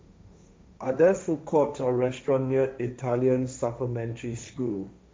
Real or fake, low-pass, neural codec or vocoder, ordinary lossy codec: fake; none; codec, 16 kHz, 1.1 kbps, Voila-Tokenizer; none